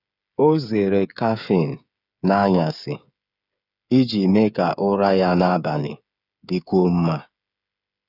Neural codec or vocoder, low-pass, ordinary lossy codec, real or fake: codec, 16 kHz, 8 kbps, FreqCodec, smaller model; 5.4 kHz; none; fake